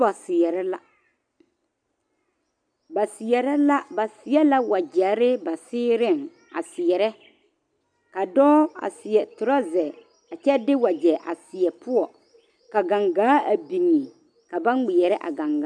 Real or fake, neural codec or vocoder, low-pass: real; none; 9.9 kHz